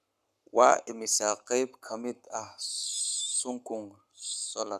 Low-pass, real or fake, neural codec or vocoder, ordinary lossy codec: 14.4 kHz; fake; codec, 44.1 kHz, 7.8 kbps, Pupu-Codec; none